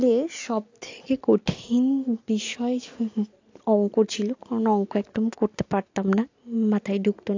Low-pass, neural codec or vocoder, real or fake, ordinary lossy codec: 7.2 kHz; none; real; AAC, 48 kbps